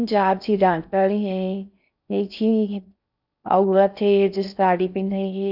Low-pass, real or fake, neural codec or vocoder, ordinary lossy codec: 5.4 kHz; fake; codec, 16 kHz in and 24 kHz out, 0.6 kbps, FocalCodec, streaming, 4096 codes; none